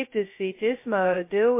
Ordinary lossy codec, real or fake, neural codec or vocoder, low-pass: MP3, 24 kbps; fake; codec, 16 kHz, 0.2 kbps, FocalCodec; 3.6 kHz